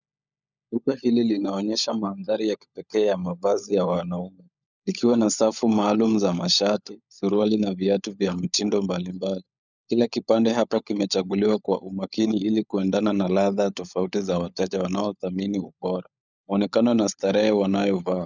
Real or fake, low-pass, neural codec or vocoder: fake; 7.2 kHz; codec, 16 kHz, 16 kbps, FunCodec, trained on LibriTTS, 50 frames a second